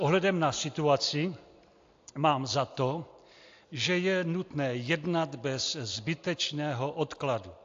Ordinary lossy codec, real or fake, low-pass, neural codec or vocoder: AAC, 48 kbps; real; 7.2 kHz; none